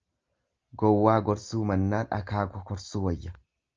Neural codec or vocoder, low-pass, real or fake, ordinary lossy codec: none; 7.2 kHz; real; Opus, 24 kbps